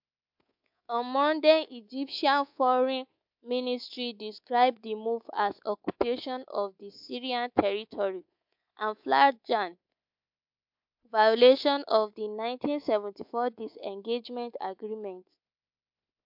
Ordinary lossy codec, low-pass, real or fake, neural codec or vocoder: MP3, 48 kbps; 5.4 kHz; fake; codec, 24 kHz, 3.1 kbps, DualCodec